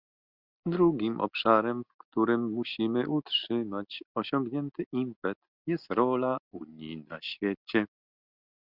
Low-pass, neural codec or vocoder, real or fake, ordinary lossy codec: 5.4 kHz; none; real; Opus, 64 kbps